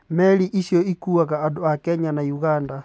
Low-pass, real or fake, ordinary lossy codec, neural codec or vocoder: none; real; none; none